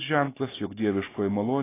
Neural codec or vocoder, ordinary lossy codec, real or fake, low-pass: none; AAC, 16 kbps; real; 3.6 kHz